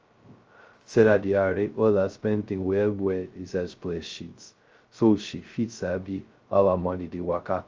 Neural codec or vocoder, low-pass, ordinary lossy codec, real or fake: codec, 16 kHz, 0.2 kbps, FocalCodec; 7.2 kHz; Opus, 24 kbps; fake